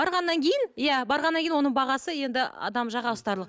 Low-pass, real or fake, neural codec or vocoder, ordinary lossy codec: none; real; none; none